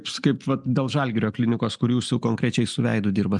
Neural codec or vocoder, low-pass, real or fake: none; 10.8 kHz; real